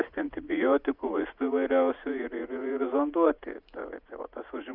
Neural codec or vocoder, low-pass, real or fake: vocoder, 44.1 kHz, 80 mel bands, Vocos; 5.4 kHz; fake